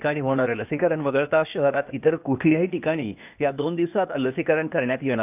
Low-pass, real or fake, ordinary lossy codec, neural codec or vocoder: 3.6 kHz; fake; none; codec, 16 kHz, 0.8 kbps, ZipCodec